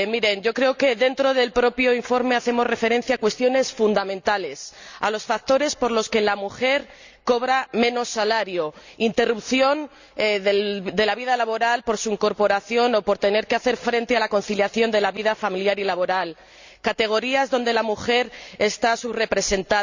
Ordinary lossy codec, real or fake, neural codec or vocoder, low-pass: Opus, 64 kbps; real; none; 7.2 kHz